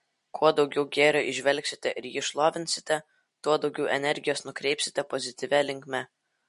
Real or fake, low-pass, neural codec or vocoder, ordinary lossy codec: real; 14.4 kHz; none; MP3, 48 kbps